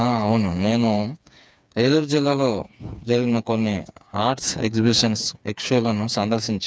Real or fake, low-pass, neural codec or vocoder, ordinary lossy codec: fake; none; codec, 16 kHz, 4 kbps, FreqCodec, smaller model; none